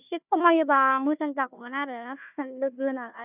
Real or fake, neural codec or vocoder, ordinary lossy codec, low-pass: fake; codec, 16 kHz, 1 kbps, FunCodec, trained on Chinese and English, 50 frames a second; none; 3.6 kHz